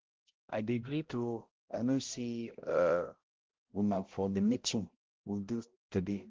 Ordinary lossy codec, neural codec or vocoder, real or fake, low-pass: Opus, 16 kbps; codec, 16 kHz, 0.5 kbps, X-Codec, HuBERT features, trained on balanced general audio; fake; 7.2 kHz